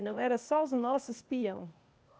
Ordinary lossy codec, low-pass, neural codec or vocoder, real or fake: none; none; codec, 16 kHz, 0.8 kbps, ZipCodec; fake